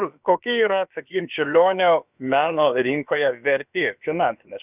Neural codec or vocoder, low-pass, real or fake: codec, 16 kHz, about 1 kbps, DyCAST, with the encoder's durations; 3.6 kHz; fake